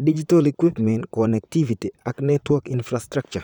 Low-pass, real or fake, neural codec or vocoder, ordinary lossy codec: 19.8 kHz; fake; vocoder, 44.1 kHz, 128 mel bands, Pupu-Vocoder; none